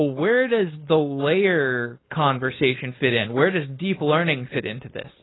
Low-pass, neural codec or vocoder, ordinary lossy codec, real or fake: 7.2 kHz; codec, 16 kHz, 4.8 kbps, FACodec; AAC, 16 kbps; fake